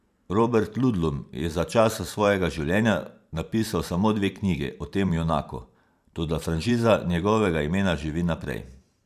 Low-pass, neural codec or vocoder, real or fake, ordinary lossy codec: 14.4 kHz; vocoder, 44.1 kHz, 128 mel bands every 512 samples, BigVGAN v2; fake; none